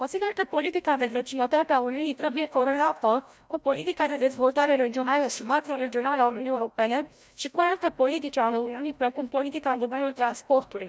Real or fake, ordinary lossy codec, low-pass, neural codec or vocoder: fake; none; none; codec, 16 kHz, 0.5 kbps, FreqCodec, larger model